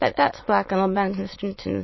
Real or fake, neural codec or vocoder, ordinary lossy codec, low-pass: fake; autoencoder, 22.05 kHz, a latent of 192 numbers a frame, VITS, trained on many speakers; MP3, 24 kbps; 7.2 kHz